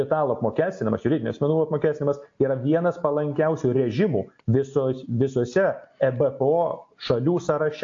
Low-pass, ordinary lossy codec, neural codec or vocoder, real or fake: 7.2 kHz; AAC, 48 kbps; none; real